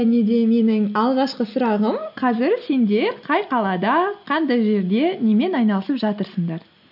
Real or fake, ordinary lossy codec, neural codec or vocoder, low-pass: real; none; none; 5.4 kHz